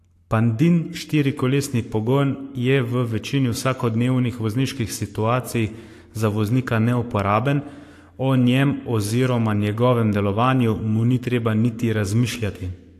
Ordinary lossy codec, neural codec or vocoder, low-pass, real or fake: AAC, 64 kbps; codec, 44.1 kHz, 7.8 kbps, Pupu-Codec; 14.4 kHz; fake